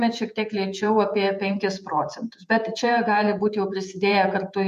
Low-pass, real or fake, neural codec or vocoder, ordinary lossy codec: 14.4 kHz; fake; vocoder, 48 kHz, 128 mel bands, Vocos; MP3, 64 kbps